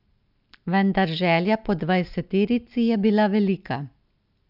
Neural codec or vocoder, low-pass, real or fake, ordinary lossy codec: none; 5.4 kHz; real; none